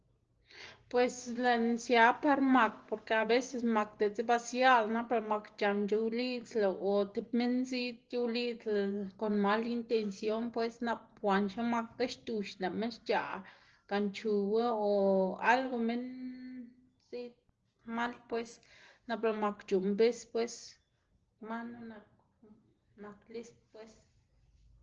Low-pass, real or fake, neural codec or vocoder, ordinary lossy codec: 7.2 kHz; real; none; Opus, 16 kbps